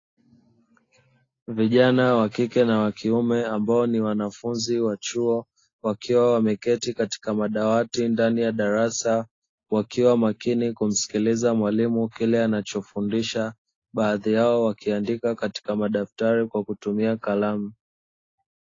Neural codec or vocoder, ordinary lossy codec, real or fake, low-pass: none; AAC, 32 kbps; real; 7.2 kHz